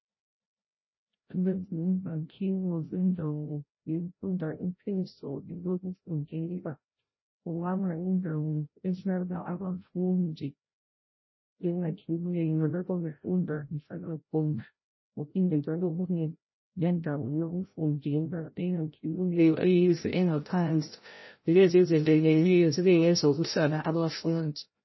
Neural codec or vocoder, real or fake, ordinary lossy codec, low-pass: codec, 16 kHz, 0.5 kbps, FreqCodec, larger model; fake; MP3, 24 kbps; 7.2 kHz